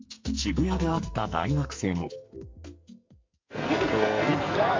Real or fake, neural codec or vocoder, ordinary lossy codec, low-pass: fake; codec, 44.1 kHz, 2.6 kbps, SNAC; MP3, 48 kbps; 7.2 kHz